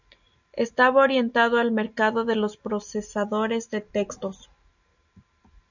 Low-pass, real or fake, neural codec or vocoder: 7.2 kHz; real; none